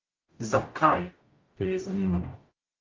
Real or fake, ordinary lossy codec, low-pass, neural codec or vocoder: fake; Opus, 24 kbps; 7.2 kHz; codec, 44.1 kHz, 0.9 kbps, DAC